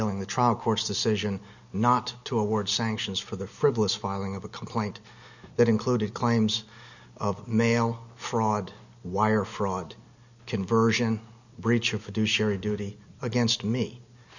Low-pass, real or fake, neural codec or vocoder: 7.2 kHz; real; none